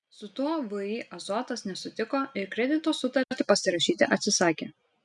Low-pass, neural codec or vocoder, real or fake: 10.8 kHz; none; real